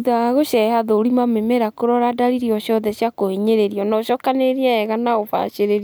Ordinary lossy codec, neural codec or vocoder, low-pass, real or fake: none; none; none; real